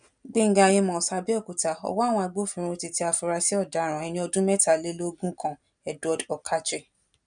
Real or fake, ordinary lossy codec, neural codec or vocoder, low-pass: real; none; none; 9.9 kHz